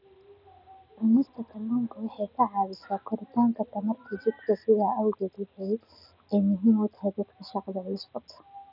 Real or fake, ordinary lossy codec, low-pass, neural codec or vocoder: real; none; 5.4 kHz; none